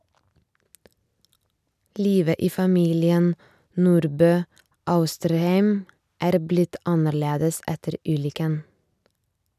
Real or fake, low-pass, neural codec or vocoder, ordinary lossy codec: real; 14.4 kHz; none; none